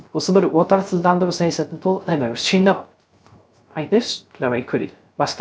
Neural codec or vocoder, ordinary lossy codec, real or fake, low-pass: codec, 16 kHz, 0.3 kbps, FocalCodec; none; fake; none